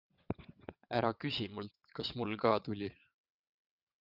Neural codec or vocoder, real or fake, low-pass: vocoder, 22.05 kHz, 80 mel bands, WaveNeXt; fake; 5.4 kHz